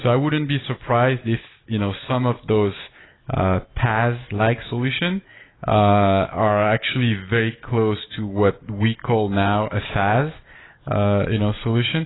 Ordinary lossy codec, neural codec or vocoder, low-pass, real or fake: AAC, 16 kbps; none; 7.2 kHz; real